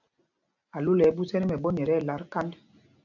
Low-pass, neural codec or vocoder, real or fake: 7.2 kHz; none; real